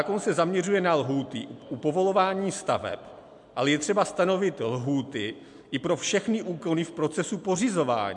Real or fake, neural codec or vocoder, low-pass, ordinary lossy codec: real; none; 10.8 kHz; MP3, 64 kbps